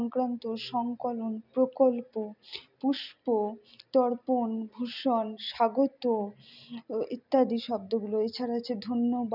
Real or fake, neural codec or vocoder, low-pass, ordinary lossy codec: real; none; 5.4 kHz; none